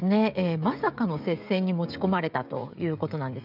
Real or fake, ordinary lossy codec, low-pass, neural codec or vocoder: fake; none; 5.4 kHz; codec, 16 kHz, 16 kbps, FreqCodec, smaller model